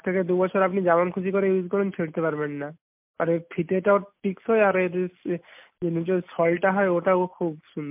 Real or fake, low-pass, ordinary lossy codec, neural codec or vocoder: real; 3.6 kHz; MP3, 32 kbps; none